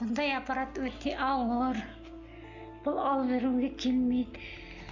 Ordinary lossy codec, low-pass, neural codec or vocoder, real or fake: none; 7.2 kHz; none; real